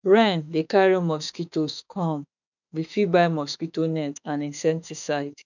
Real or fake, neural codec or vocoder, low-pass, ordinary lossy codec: fake; autoencoder, 48 kHz, 32 numbers a frame, DAC-VAE, trained on Japanese speech; 7.2 kHz; none